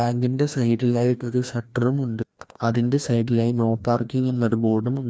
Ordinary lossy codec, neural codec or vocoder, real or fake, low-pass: none; codec, 16 kHz, 1 kbps, FreqCodec, larger model; fake; none